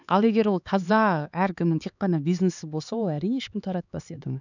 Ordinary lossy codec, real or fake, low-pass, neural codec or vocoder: none; fake; 7.2 kHz; codec, 16 kHz, 4 kbps, X-Codec, HuBERT features, trained on LibriSpeech